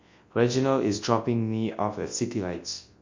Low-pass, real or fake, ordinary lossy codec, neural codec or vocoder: 7.2 kHz; fake; MP3, 48 kbps; codec, 24 kHz, 0.9 kbps, WavTokenizer, large speech release